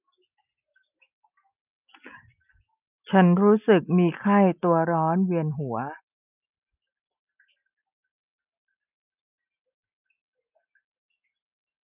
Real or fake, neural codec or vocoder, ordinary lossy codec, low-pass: real; none; none; 3.6 kHz